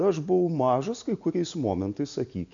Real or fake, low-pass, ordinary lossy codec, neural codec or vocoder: real; 7.2 kHz; AAC, 64 kbps; none